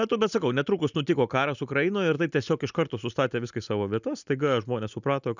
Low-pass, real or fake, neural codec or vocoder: 7.2 kHz; real; none